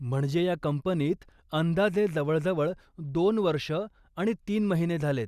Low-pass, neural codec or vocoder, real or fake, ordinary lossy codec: 14.4 kHz; none; real; Opus, 64 kbps